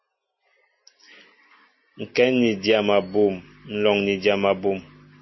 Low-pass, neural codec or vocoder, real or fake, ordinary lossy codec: 7.2 kHz; none; real; MP3, 24 kbps